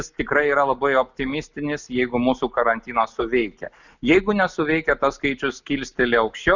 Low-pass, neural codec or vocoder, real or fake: 7.2 kHz; none; real